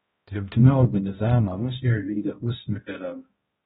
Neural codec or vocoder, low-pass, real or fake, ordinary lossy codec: codec, 16 kHz, 0.5 kbps, X-Codec, HuBERT features, trained on balanced general audio; 7.2 kHz; fake; AAC, 16 kbps